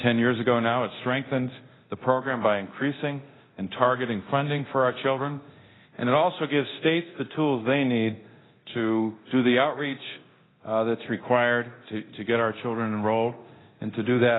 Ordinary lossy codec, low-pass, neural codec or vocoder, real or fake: AAC, 16 kbps; 7.2 kHz; codec, 24 kHz, 0.9 kbps, DualCodec; fake